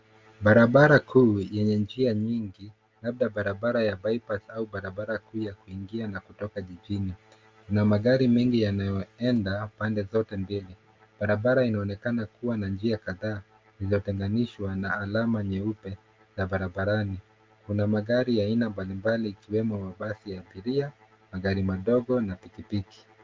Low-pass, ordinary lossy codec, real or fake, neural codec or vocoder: 7.2 kHz; Opus, 32 kbps; real; none